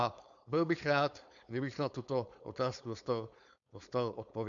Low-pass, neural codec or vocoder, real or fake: 7.2 kHz; codec, 16 kHz, 4.8 kbps, FACodec; fake